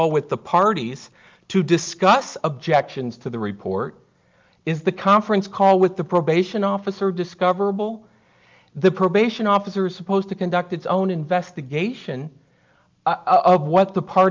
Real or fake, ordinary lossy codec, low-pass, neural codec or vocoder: real; Opus, 24 kbps; 7.2 kHz; none